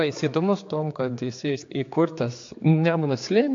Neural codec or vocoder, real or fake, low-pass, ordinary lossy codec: codec, 16 kHz, 4 kbps, X-Codec, HuBERT features, trained on general audio; fake; 7.2 kHz; AAC, 48 kbps